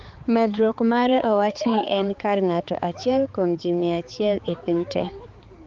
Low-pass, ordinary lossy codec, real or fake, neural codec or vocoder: 7.2 kHz; Opus, 24 kbps; fake; codec, 16 kHz, 4 kbps, X-Codec, HuBERT features, trained on balanced general audio